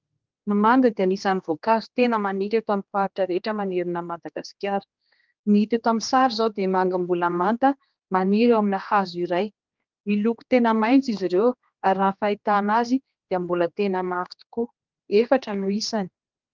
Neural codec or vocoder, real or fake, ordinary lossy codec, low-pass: codec, 16 kHz, 2 kbps, X-Codec, HuBERT features, trained on general audio; fake; Opus, 24 kbps; 7.2 kHz